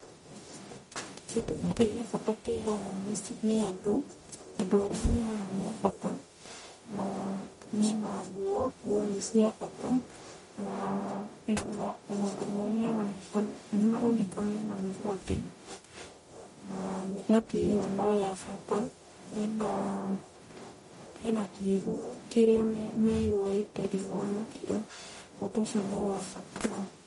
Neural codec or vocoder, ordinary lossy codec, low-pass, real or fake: codec, 44.1 kHz, 0.9 kbps, DAC; MP3, 48 kbps; 19.8 kHz; fake